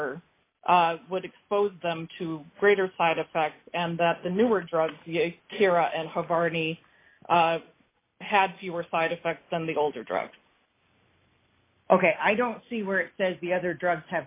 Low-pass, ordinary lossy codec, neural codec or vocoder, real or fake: 3.6 kHz; AAC, 24 kbps; none; real